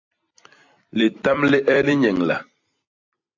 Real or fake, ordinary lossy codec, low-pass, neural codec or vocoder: fake; AAC, 48 kbps; 7.2 kHz; vocoder, 44.1 kHz, 128 mel bands every 256 samples, BigVGAN v2